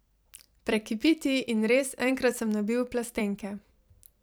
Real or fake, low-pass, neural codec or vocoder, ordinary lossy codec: fake; none; vocoder, 44.1 kHz, 128 mel bands every 256 samples, BigVGAN v2; none